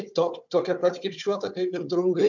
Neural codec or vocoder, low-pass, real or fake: codec, 16 kHz, 4 kbps, FunCodec, trained on Chinese and English, 50 frames a second; 7.2 kHz; fake